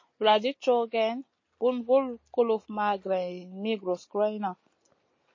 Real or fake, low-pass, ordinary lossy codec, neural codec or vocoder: real; 7.2 kHz; MP3, 32 kbps; none